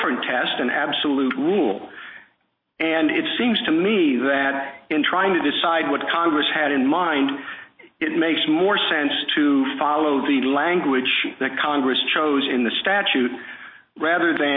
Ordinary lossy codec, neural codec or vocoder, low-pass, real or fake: MP3, 24 kbps; none; 5.4 kHz; real